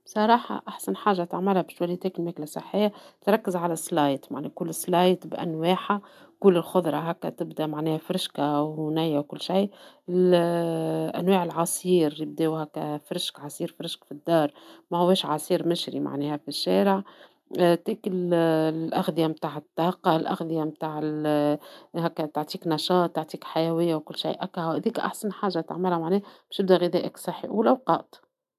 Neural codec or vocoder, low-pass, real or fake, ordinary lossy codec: none; 14.4 kHz; real; none